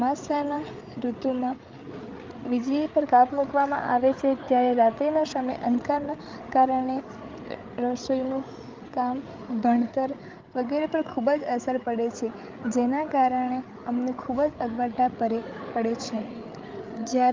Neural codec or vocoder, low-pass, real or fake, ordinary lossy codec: codec, 16 kHz, 8 kbps, FreqCodec, larger model; 7.2 kHz; fake; Opus, 24 kbps